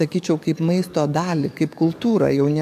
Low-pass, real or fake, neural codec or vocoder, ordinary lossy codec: 14.4 kHz; fake; codec, 44.1 kHz, 7.8 kbps, DAC; AAC, 96 kbps